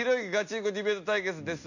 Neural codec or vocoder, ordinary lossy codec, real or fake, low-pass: none; none; real; 7.2 kHz